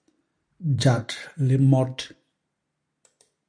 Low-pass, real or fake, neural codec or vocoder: 9.9 kHz; real; none